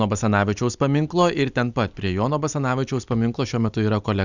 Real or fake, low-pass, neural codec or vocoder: real; 7.2 kHz; none